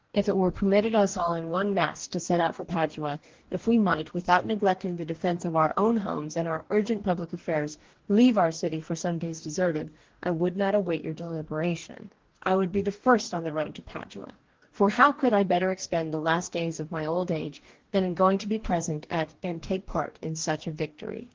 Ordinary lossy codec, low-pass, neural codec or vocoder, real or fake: Opus, 16 kbps; 7.2 kHz; codec, 44.1 kHz, 2.6 kbps, DAC; fake